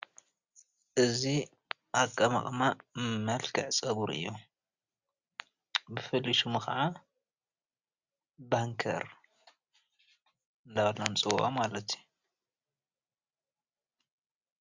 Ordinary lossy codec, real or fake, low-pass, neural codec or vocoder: Opus, 64 kbps; real; 7.2 kHz; none